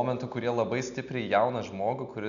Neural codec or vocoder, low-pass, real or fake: none; 7.2 kHz; real